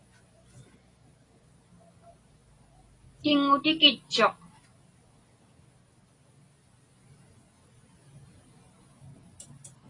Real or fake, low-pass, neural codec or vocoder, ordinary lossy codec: real; 10.8 kHz; none; AAC, 32 kbps